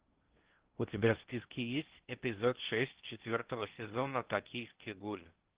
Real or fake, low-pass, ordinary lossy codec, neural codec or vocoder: fake; 3.6 kHz; Opus, 16 kbps; codec, 16 kHz in and 24 kHz out, 0.6 kbps, FocalCodec, streaming, 2048 codes